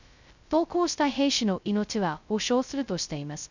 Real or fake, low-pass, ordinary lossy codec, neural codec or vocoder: fake; 7.2 kHz; none; codec, 16 kHz, 0.2 kbps, FocalCodec